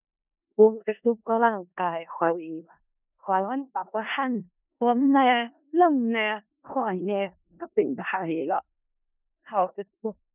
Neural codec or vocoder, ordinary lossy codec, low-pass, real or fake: codec, 16 kHz in and 24 kHz out, 0.4 kbps, LongCat-Audio-Codec, four codebook decoder; none; 3.6 kHz; fake